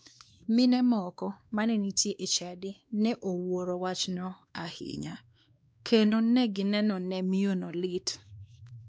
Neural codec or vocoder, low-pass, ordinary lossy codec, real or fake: codec, 16 kHz, 2 kbps, X-Codec, WavLM features, trained on Multilingual LibriSpeech; none; none; fake